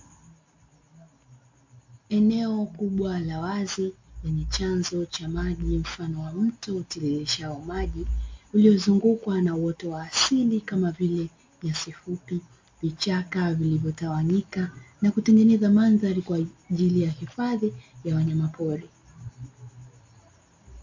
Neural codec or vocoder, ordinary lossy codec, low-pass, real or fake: none; MP3, 48 kbps; 7.2 kHz; real